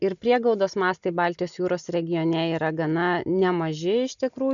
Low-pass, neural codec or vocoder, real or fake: 7.2 kHz; none; real